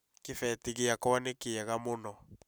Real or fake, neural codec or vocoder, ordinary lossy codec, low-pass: real; none; none; none